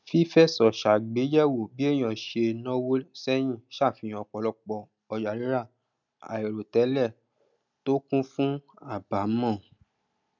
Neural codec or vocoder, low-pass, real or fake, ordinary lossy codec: none; 7.2 kHz; real; none